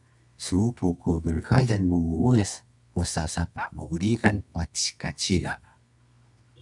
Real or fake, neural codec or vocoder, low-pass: fake; codec, 24 kHz, 0.9 kbps, WavTokenizer, medium music audio release; 10.8 kHz